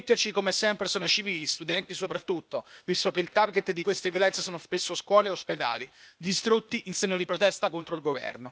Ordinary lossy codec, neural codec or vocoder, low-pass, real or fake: none; codec, 16 kHz, 0.8 kbps, ZipCodec; none; fake